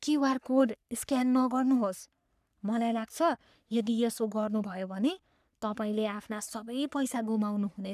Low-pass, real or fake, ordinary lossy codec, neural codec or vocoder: 14.4 kHz; fake; none; codec, 44.1 kHz, 3.4 kbps, Pupu-Codec